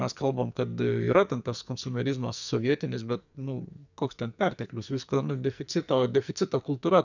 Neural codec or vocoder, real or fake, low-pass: codec, 44.1 kHz, 2.6 kbps, SNAC; fake; 7.2 kHz